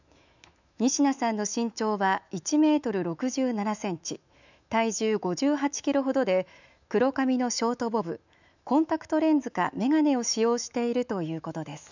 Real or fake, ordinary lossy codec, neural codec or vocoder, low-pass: fake; none; autoencoder, 48 kHz, 128 numbers a frame, DAC-VAE, trained on Japanese speech; 7.2 kHz